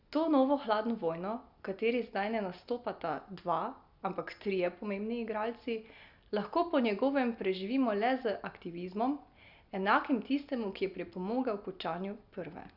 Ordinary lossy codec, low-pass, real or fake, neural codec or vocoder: Opus, 64 kbps; 5.4 kHz; real; none